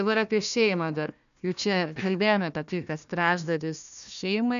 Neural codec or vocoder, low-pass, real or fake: codec, 16 kHz, 1 kbps, FunCodec, trained on Chinese and English, 50 frames a second; 7.2 kHz; fake